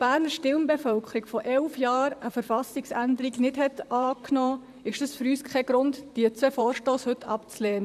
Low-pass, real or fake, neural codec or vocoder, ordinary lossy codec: 14.4 kHz; fake; vocoder, 44.1 kHz, 128 mel bands, Pupu-Vocoder; none